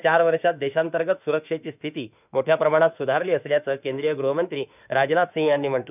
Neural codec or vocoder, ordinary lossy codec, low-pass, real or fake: autoencoder, 48 kHz, 32 numbers a frame, DAC-VAE, trained on Japanese speech; none; 3.6 kHz; fake